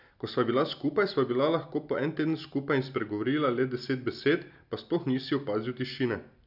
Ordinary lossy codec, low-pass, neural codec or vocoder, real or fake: none; 5.4 kHz; none; real